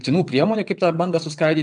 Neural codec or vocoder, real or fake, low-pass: vocoder, 22.05 kHz, 80 mel bands, WaveNeXt; fake; 9.9 kHz